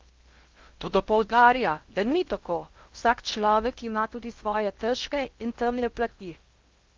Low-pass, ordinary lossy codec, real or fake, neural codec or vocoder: 7.2 kHz; Opus, 24 kbps; fake; codec, 16 kHz in and 24 kHz out, 0.8 kbps, FocalCodec, streaming, 65536 codes